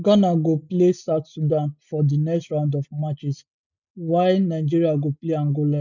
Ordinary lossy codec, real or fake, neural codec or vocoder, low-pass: none; real; none; 7.2 kHz